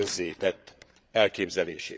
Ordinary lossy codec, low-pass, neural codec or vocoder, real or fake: none; none; codec, 16 kHz, 8 kbps, FreqCodec, larger model; fake